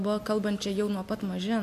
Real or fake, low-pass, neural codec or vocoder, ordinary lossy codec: real; 14.4 kHz; none; MP3, 64 kbps